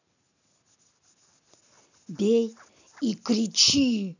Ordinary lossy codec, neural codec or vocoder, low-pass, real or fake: none; none; 7.2 kHz; real